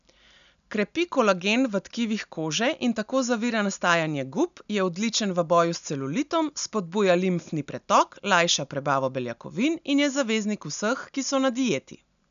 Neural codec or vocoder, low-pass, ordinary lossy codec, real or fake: none; 7.2 kHz; none; real